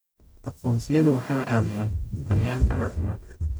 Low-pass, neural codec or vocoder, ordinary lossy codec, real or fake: none; codec, 44.1 kHz, 0.9 kbps, DAC; none; fake